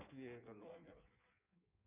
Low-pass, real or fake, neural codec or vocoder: 3.6 kHz; fake; codec, 16 kHz in and 24 kHz out, 1.1 kbps, FireRedTTS-2 codec